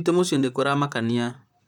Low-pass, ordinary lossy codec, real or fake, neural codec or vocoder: 19.8 kHz; none; fake; vocoder, 44.1 kHz, 128 mel bands, Pupu-Vocoder